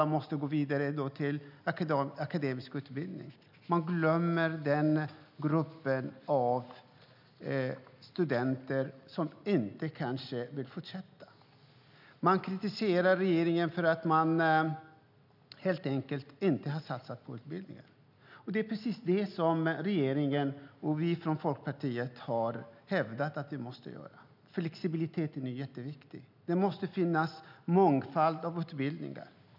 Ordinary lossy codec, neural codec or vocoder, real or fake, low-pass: none; none; real; 5.4 kHz